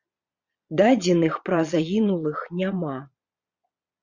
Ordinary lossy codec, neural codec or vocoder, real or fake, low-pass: Opus, 64 kbps; vocoder, 22.05 kHz, 80 mel bands, Vocos; fake; 7.2 kHz